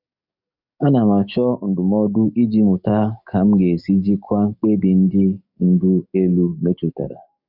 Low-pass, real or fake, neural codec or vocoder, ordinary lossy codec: 5.4 kHz; fake; codec, 44.1 kHz, 7.8 kbps, DAC; none